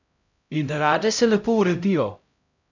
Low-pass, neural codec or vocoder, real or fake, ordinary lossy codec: 7.2 kHz; codec, 16 kHz, 0.5 kbps, X-Codec, HuBERT features, trained on LibriSpeech; fake; none